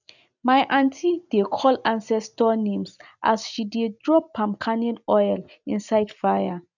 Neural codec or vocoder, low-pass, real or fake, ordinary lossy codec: none; 7.2 kHz; real; none